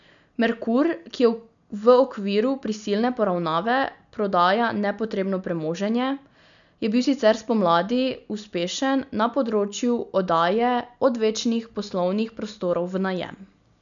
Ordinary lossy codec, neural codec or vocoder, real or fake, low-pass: none; none; real; 7.2 kHz